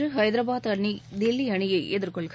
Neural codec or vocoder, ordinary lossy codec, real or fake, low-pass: none; none; real; none